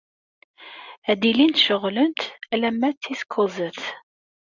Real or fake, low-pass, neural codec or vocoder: real; 7.2 kHz; none